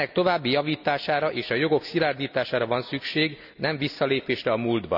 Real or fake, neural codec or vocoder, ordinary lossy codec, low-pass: real; none; none; 5.4 kHz